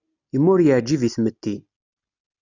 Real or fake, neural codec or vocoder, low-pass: real; none; 7.2 kHz